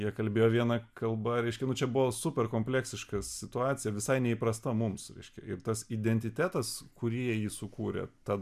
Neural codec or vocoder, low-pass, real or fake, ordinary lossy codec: none; 14.4 kHz; real; MP3, 96 kbps